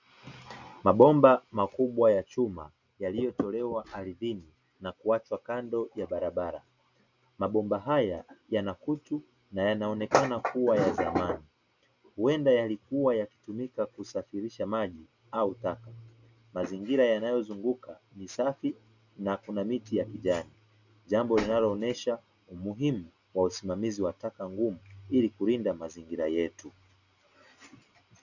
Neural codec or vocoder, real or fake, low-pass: none; real; 7.2 kHz